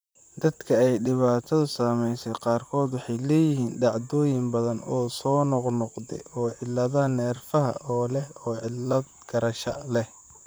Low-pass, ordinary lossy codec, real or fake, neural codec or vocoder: none; none; fake; vocoder, 44.1 kHz, 128 mel bands, Pupu-Vocoder